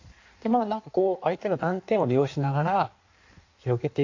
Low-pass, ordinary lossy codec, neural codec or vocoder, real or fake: 7.2 kHz; AAC, 48 kbps; codec, 16 kHz in and 24 kHz out, 1.1 kbps, FireRedTTS-2 codec; fake